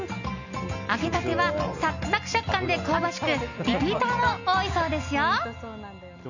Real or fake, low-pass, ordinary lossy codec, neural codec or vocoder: real; 7.2 kHz; none; none